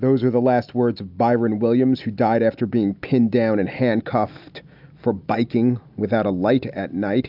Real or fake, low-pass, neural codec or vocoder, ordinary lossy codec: real; 5.4 kHz; none; AAC, 48 kbps